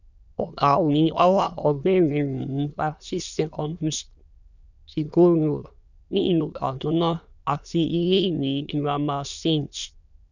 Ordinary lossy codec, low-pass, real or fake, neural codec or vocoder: none; 7.2 kHz; fake; autoencoder, 22.05 kHz, a latent of 192 numbers a frame, VITS, trained on many speakers